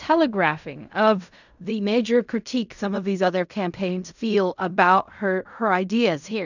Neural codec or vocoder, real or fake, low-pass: codec, 16 kHz in and 24 kHz out, 0.4 kbps, LongCat-Audio-Codec, fine tuned four codebook decoder; fake; 7.2 kHz